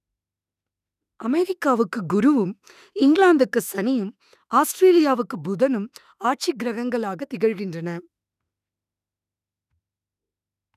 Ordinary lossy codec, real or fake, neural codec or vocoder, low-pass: none; fake; autoencoder, 48 kHz, 32 numbers a frame, DAC-VAE, trained on Japanese speech; 14.4 kHz